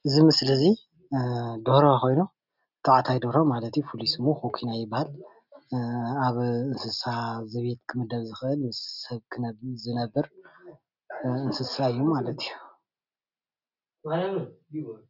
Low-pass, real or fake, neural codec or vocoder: 5.4 kHz; real; none